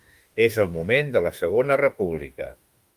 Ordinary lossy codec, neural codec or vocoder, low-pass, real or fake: Opus, 32 kbps; autoencoder, 48 kHz, 32 numbers a frame, DAC-VAE, trained on Japanese speech; 14.4 kHz; fake